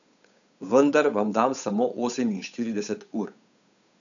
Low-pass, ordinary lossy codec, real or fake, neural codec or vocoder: 7.2 kHz; none; fake; codec, 16 kHz, 8 kbps, FunCodec, trained on Chinese and English, 25 frames a second